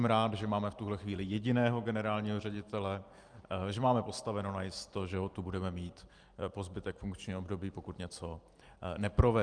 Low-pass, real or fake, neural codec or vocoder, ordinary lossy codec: 9.9 kHz; real; none; Opus, 32 kbps